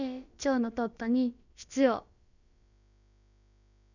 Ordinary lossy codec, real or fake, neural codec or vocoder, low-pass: none; fake; codec, 16 kHz, about 1 kbps, DyCAST, with the encoder's durations; 7.2 kHz